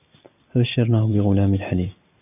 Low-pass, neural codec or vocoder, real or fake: 3.6 kHz; none; real